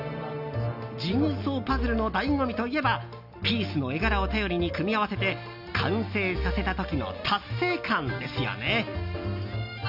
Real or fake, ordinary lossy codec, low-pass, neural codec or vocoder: real; none; 5.4 kHz; none